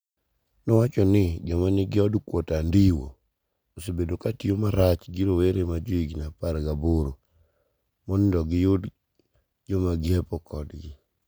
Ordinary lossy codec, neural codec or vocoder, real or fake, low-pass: none; none; real; none